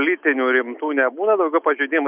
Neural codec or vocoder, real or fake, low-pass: none; real; 3.6 kHz